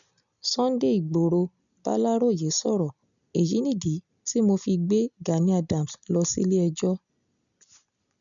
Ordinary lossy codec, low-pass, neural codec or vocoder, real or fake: none; 7.2 kHz; none; real